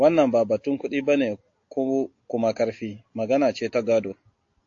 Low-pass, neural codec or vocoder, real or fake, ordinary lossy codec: 7.2 kHz; none; real; AAC, 48 kbps